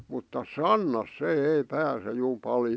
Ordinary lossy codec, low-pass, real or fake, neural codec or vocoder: none; none; real; none